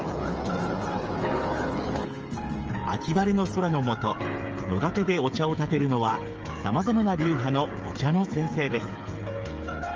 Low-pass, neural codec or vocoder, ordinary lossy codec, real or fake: 7.2 kHz; codec, 16 kHz, 8 kbps, FreqCodec, smaller model; Opus, 24 kbps; fake